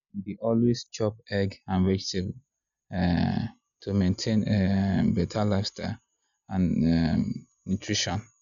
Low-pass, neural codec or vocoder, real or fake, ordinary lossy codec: 7.2 kHz; none; real; none